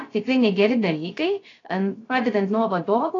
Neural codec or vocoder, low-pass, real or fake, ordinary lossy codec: codec, 16 kHz, 0.3 kbps, FocalCodec; 7.2 kHz; fake; AAC, 32 kbps